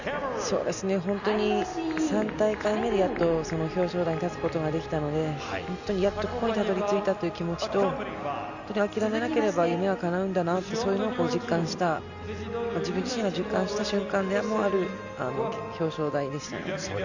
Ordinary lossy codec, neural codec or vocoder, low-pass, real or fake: none; none; 7.2 kHz; real